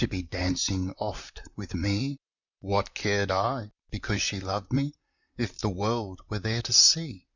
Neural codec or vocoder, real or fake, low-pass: vocoder, 44.1 kHz, 128 mel bands, Pupu-Vocoder; fake; 7.2 kHz